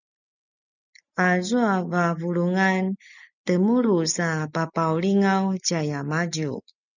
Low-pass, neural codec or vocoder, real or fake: 7.2 kHz; none; real